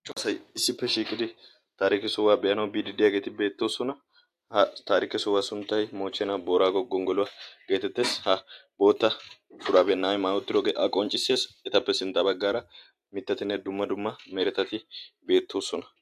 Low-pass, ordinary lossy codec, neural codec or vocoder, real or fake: 14.4 kHz; AAC, 64 kbps; none; real